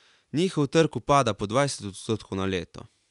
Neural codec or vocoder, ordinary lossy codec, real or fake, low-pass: none; none; real; 10.8 kHz